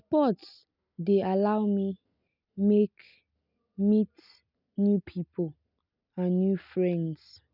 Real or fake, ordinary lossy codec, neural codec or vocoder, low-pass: real; none; none; 5.4 kHz